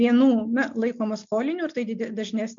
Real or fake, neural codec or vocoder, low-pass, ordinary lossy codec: real; none; 7.2 kHz; MP3, 64 kbps